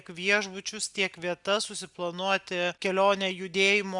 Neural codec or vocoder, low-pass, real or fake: none; 10.8 kHz; real